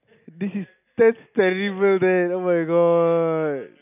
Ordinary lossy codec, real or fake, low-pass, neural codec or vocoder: none; real; 3.6 kHz; none